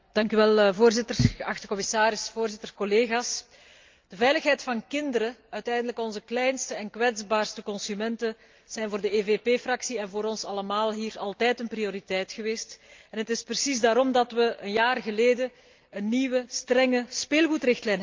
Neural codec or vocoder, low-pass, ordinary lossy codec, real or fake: none; 7.2 kHz; Opus, 24 kbps; real